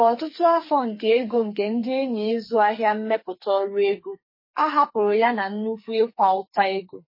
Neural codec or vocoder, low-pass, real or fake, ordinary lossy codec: codec, 44.1 kHz, 2.6 kbps, SNAC; 5.4 kHz; fake; MP3, 24 kbps